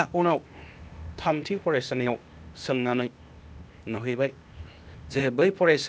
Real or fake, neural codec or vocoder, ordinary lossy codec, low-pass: fake; codec, 16 kHz, 0.8 kbps, ZipCodec; none; none